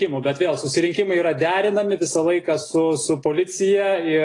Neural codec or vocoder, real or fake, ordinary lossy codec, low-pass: none; real; AAC, 32 kbps; 10.8 kHz